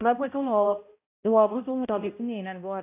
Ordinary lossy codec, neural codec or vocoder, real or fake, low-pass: AAC, 24 kbps; codec, 16 kHz, 0.5 kbps, X-Codec, HuBERT features, trained on balanced general audio; fake; 3.6 kHz